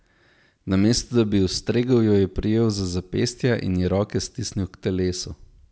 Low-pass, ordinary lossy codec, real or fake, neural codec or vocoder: none; none; real; none